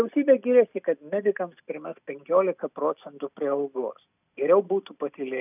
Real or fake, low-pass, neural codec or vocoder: real; 3.6 kHz; none